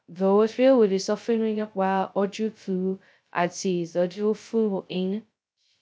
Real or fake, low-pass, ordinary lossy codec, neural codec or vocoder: fake; none; none; codec, 16 kHz, 0.2 kbps, FocalCodec